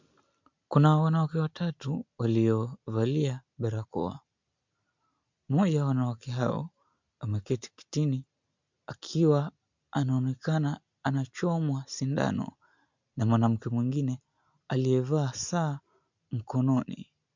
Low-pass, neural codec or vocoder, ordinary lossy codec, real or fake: 7.2 kHz; none; MP3, 64 kbps; real